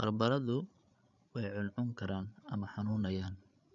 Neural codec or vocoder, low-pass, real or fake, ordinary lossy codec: codec, 16 kHz, 8 kbps, FreqCodec, larger model; 7.2 kHz; fake; none